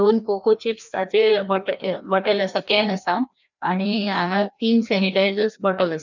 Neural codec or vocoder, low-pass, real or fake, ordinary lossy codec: codec, 16 kHz, 1 kbps, FreqCodec, larger model; 7.2 kHz; fake; none